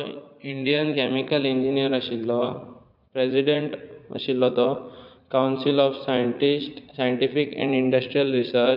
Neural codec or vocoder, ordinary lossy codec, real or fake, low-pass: vocoder, 44.1 kHz, 80 mel bands, Vocos; none; fake; 5.4 kHz